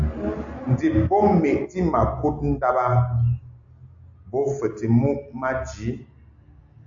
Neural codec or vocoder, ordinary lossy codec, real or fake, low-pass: none; AAC, 64 kbps; real; 7.2 kHz